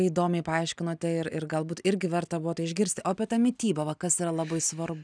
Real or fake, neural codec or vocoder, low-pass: real; none; 9.9 kHz